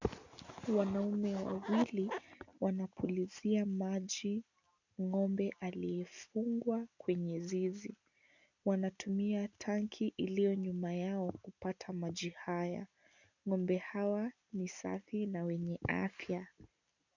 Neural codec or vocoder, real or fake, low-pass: none; real; 7.2 kHz